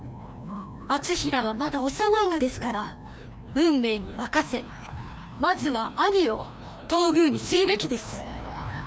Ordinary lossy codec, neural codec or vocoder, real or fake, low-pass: none; codec, 16 kHz, 1 kbps, FreqCodec, larger model; fake; none